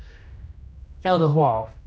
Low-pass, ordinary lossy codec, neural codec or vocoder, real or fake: none; none; codec, 16 kHz, 1 kbps, X-Codec, HuBERT features, trained on general audio; fake